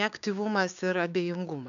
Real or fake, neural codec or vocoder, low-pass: fake; codec, 16 kHz, 6 kbps, DAC; 7.2 kHz